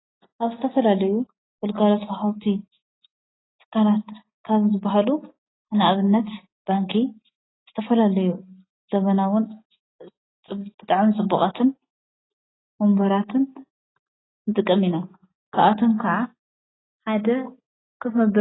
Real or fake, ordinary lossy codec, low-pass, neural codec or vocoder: real; AAC, 16 kbps; 7.2 kHz; none